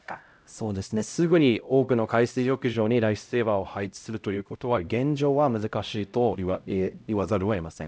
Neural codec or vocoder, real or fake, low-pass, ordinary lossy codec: codec, 16 kHz, 0.5 kbps, X-Codec, HuBERT features, trained on LibriSpeech; fake; none; none